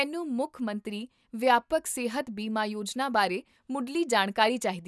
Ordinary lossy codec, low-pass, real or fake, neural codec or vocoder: none; none; real; none